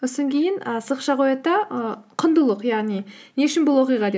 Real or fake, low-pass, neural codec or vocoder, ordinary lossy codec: real; none; none; none